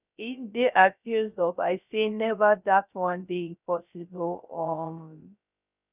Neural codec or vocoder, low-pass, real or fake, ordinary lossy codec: codec, 16 kHz, 0.3 kbps, FocalCodec; 3.6 kHz; fake; none